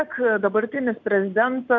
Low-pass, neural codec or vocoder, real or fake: 7.2 kHz; none; real